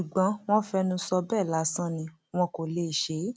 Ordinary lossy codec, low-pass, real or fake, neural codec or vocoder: none; none; real; none